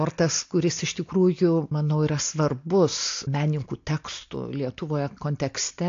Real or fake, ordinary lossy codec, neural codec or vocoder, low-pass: real; AAC, 48 kbps; none; 7.2 kHz